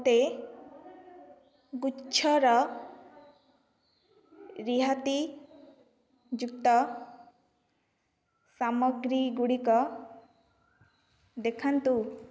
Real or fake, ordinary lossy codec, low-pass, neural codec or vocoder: real; none; none; none